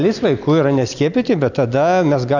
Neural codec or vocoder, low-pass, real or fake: none; 7.2 kHz; real